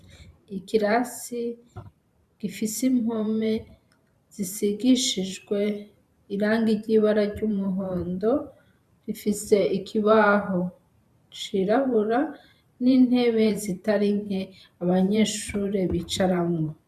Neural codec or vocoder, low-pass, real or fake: vocoder, 44.1 kHz, 128 mel bands every 512 samples, BigVGAN v2; 14.4 kHz; fake